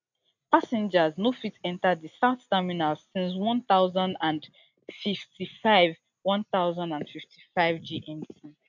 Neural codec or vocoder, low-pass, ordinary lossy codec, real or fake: none; 7.2 kHz; none; real